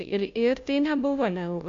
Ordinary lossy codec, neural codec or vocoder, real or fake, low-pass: MP3, 64 kbps; codec, 16 kHz, 0.5 kbps, FunCodec, trained on Chinese and English, 25 frames a second; fake; 7.2 kHz